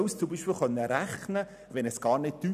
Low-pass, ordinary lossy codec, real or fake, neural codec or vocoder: 14.4 kHz; none; real; none